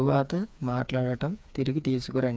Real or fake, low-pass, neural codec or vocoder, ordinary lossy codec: fake; none; codec, 16 kHz, 4 kbps, FreqCodec, smaller model; none